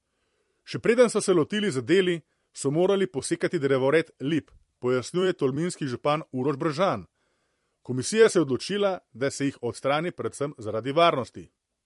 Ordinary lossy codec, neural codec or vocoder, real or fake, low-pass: MP3, 48 kbps; vocoder, 44.1 kHz, 128 mel bands every 256 samples, BigVGAN v2; fake; 14.4 kHz